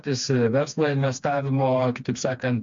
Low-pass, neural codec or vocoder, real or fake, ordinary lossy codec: 7.2 kHz; codec, 16 kHz, 2 kbps, FreqCodec, smaller model; fake; MP3, 64 kbps